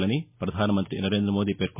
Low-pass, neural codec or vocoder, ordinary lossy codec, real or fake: 3.6 kHz; none; MP3, 32 kbps; real